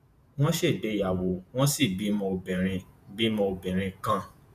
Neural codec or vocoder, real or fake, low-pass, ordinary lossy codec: vocoder, 48 kHz, 128 mel bands, Vocos; fake; 14.4 kHz; none